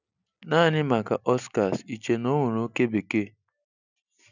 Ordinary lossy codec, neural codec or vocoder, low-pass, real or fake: none; none; 7.2 kHz; real